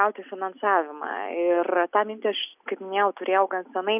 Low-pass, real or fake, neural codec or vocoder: 3.6 kHz; real; none